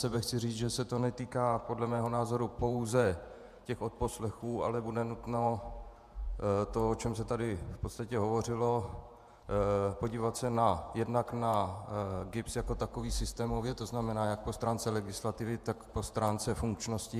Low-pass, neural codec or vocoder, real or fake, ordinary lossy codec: 14.4 kHz; vocoder, 48 kHz, 128 mel bands, Vocos; fake; MP3, 96 kbps